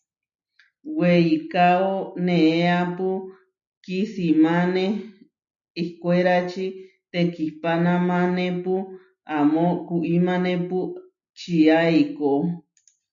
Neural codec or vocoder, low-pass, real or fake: none; 7.2 kHz; real